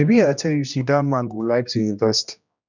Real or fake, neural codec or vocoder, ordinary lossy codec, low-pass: fake; codec, 16 kHz, 1 kbps, X-Codec, HuBERT features, trained on general audio; none; 7.2 kHz